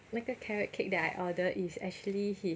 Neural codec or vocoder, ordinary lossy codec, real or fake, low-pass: none; none; real; none